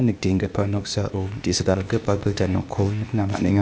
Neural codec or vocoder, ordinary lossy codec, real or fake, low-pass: codec, 16 kHz, 0.8 kbps, ZipCodec; none; fake; none